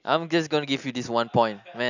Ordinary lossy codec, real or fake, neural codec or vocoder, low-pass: none; real; none; 7.2 kHz